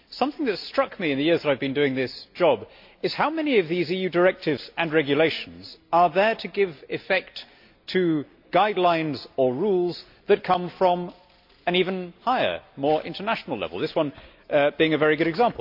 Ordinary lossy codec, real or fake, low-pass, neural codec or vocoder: MP3, 48 kbps; real; 5.4 kHz; none